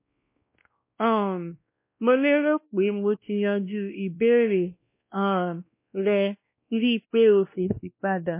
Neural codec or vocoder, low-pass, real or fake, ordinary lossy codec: codec, 16 kHz, 1 kbps, X-Codec, WavLM features, trained on Multilingual LibriSpeech; 3.6 kHz; fake; MP3, 24 kbps